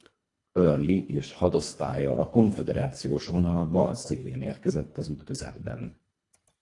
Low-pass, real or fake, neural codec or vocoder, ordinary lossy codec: 10.8 kHz; fake; codec, 24 kHz, 1.5 kbps, HILCodec; AAC, 48 kbps